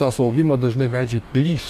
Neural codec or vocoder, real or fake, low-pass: codec, 44.1 kHz, 2.6 kbps, DAC; fake; 14.4 kHz